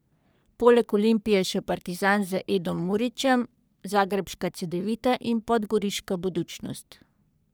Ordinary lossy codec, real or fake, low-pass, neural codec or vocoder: none; fake; none; codec, 44.1 kHz, 3.4 kbps, Pupu-Codec